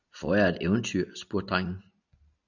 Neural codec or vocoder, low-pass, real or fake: none; 7.2 kHz; real